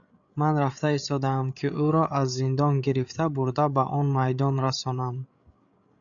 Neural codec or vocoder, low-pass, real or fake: codec, 16 kHz, 8 kbps, FreqCodec, larger model; 7.2 kHz; fake